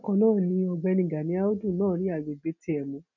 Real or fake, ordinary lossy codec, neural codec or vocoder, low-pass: real; none; none; 7.2 kHz